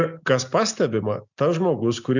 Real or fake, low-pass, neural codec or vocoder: real; 7.2 kHz; none